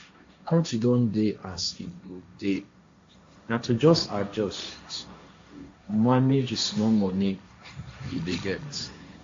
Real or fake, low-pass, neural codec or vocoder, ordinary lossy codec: fake; 7.2 kHz; codec, 16 kHz, 1.1 kbps, Voila-Tokenizer; AAC, 48 kbps